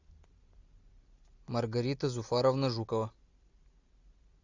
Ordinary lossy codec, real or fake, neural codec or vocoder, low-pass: Opus, 64 kbps; real; none; 7.2 kHz